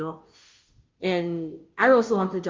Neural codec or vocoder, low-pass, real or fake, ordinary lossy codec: codec, 16 kHz, 0.5 kbps, FunCodec, trained on Chinese and English, 25 frames a second; 7.2 kHz; fake; Opus, 24 kbps